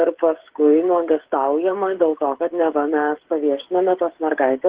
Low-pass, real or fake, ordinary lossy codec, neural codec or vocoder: 3.6 kHz; fake; Opus, 16 kbps; codec, 16 kHz, 16 kbps, FreqCodec, smaller model